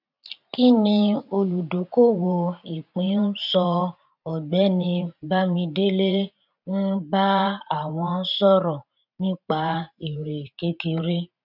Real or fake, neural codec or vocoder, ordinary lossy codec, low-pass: fake; vocoder, 44.1 kHz, 128 mel bands every 512 samples, BigVGAN v2; none; 5.4 kHz